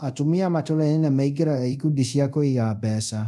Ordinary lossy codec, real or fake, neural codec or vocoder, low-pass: none; fake; codec, 24 kHz, 0.5 kbps, DualCodec; none